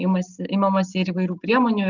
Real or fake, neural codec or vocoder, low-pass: real; none; 7.2 kHz